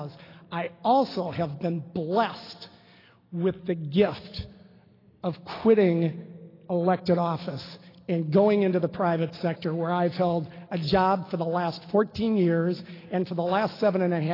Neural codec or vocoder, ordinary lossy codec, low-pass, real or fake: none; AAC, 24 kbps; 5.4 kHz; real